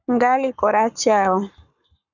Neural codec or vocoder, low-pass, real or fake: codec, 44.1 kHz, 7.8 kbps, Pupu-Codec; 7.2 kHz; fake